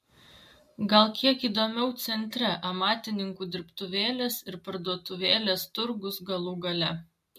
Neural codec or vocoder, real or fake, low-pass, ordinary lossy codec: none; real; 14.4 kHz; MP3, 64 kbps